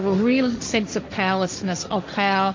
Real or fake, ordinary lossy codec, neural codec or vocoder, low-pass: fake; MP3, 64 kbps; codec, 16 kHz, 1.1 kbps, Voila-Tokenizer; 7.2 kHz